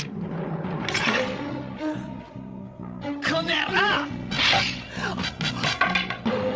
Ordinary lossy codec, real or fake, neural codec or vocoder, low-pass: none; fake; codec, 16 kHz, 16 kbps, FreqCodec, larger model; none